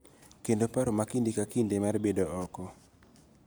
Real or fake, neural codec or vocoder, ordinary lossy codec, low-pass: real; none; none; none